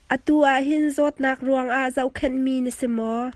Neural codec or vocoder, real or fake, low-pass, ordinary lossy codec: none; real; 10.8 kHz; Opus, 16 kbps